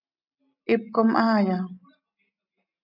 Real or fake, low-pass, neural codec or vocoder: real; 5.4 kHz; none